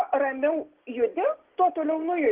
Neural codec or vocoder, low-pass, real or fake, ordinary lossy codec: none; 3.6 kHz; real; Opus, 16 kbps